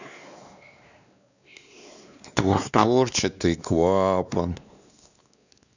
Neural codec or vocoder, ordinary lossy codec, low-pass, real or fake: codec, 16 kHz, 2 kbps, X-Codec, WavLM features, trained on Multilingual LibriSpeech; none; 7.2 kHz; fake